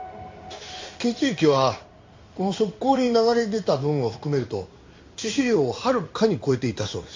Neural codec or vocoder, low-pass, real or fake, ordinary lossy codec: codec, 16 kHz in and 24 kHz out, 1 kbps, XY-Tokenizer; 7.2 kHz; fake; MP3, 48 kbps